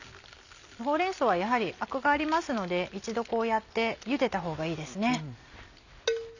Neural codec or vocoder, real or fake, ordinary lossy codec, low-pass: none; real; none; 7.2 kHz